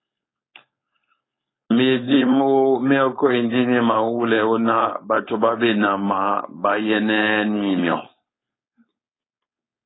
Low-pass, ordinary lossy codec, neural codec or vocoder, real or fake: 7.2 kHz; AAC, 16 kbps; codec, 16 kHz, 4.8 kbps, FACodec; fake